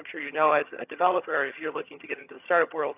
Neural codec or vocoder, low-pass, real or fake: vocoder, 22.05 kHz, 80 mel bands, HiFi-GAN; 3.6 kHz; fake